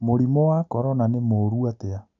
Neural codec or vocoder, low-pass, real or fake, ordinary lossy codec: none; 7.2 kHz; real; none